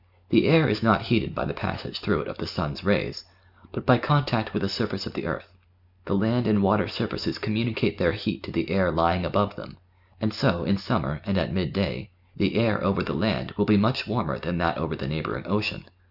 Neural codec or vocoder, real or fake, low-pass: none; real; 5.4 kHz